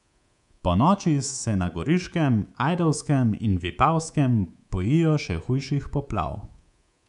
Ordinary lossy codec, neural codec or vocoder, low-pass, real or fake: none; codec, 24 kHz, 3.1 kbps, DualCodec; 10.8 kHz; fake